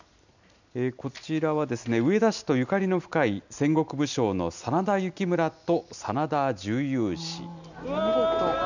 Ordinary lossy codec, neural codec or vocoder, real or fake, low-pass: none; none; real; 7.2 kHz